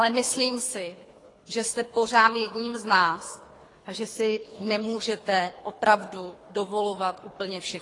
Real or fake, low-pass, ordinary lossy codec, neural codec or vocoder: fake; 10.8 kHz; AAC, 32 kbps; codec, 24 kHz, 3 kbps, HILCodec